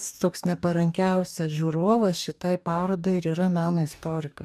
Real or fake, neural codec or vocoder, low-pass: fake; codec, 44.1 kHz, 2.6 kbps, DAC; 14.4 kHz